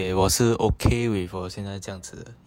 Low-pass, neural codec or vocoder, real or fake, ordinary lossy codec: 19.8 kHz; vocoder, 48 kHz, 128 mel bands, Vocos; fake; none